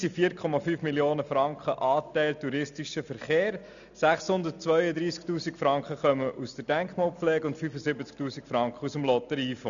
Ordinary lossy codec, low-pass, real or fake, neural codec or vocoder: none; 7.2 kHz; real; none